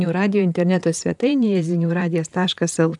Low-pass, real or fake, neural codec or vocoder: 10.8 kHz; fake; vocoder, 44.1 kHz, 128 mel bands, Pupu-Vocoder